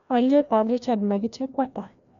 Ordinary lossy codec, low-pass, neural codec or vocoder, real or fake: none; 7.2 kHz; codec, 16 kHz, 1 kbps, FreqCodec, larger model; fake